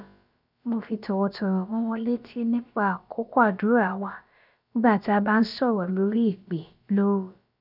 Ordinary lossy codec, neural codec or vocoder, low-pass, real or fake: none; codec, 16 kHz, about 1 kbps, DyCAST, with the encoder's durations; 5.4 kHz; fake